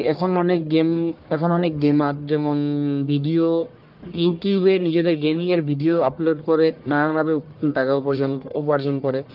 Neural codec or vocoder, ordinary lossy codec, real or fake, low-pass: codec, 44.1 kHz, 1.7 kbps, Pupu-Codec; Opus, 24 kbps; fake; 5.4 kHz